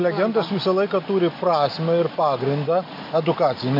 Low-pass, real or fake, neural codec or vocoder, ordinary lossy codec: 5.4 kHz; real; none; MP3, 32 kbps